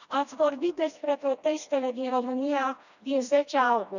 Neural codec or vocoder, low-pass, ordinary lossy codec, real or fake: codec, 16 kHz, 1 kbps, FreqCodec, smaller model; 7.2 kHz; none; fake